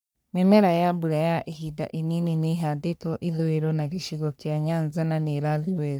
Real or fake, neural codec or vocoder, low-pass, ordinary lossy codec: fake; codec, 44.1 kHz, 3.4 kbps, Pupu-Codec; none; none